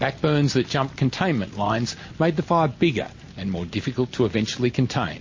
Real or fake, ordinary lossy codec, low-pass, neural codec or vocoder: real; MP3, 32 kbps; 7.2 kHz; none